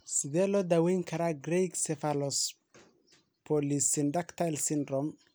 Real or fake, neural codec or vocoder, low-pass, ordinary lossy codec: real; none; none; none